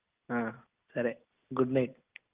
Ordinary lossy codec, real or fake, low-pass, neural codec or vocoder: Opus, 24 kbps; real; 3.6 kHz; none